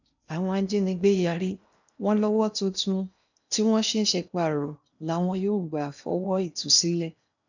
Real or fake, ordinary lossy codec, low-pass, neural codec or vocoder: fake; none; 7.2 kHz; codec, 16 kHz in and 24 kHz out, 0.8 kbps, FocalCodec, streaming, 65536 codes